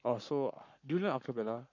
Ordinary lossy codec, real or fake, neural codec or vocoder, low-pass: none; fake; codec, 44.1 kHz, 7.8 kbps, Pupu-Codec; 7.2 kHz